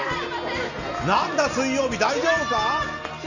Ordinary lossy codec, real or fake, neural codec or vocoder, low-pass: none; real; none; 7.2 kHz